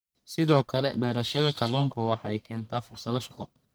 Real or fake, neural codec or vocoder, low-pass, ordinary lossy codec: fake; codec, 44.1 kHz, 1.7 kbps, Pupu-Codec; none; none